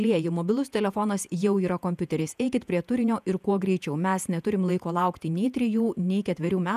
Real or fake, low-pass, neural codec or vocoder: fake; 14.4 kHz; vocoder, 48 kHz, 128 mel bands, Vocos